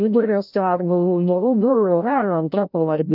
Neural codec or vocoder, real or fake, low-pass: codec, 16 kHz, 0.5 kbps, FreqCodec, larger model; fake; 5.4 kHz